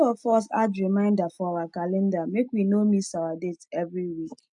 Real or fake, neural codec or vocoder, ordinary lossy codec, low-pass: real; none; none; 10.8 kHz